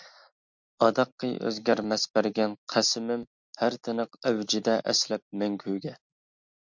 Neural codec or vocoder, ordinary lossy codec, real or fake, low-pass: none; MP3, 64 kbps; real; 7.2 kHz